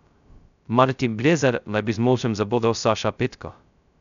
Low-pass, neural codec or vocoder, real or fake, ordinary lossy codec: 7.2 kHz; codec, 16 kHz, 0.2 kbps, FocalCodec; fake; none